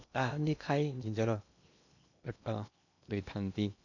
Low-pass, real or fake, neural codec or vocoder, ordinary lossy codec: 7.2 kHz; fake; codec, 16 kHz in and 24 kHz out, 0.8 kbps, FocalCodec, streaming, 65536 codes; none